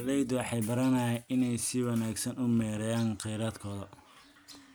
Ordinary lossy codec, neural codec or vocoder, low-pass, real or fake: none; none; none; real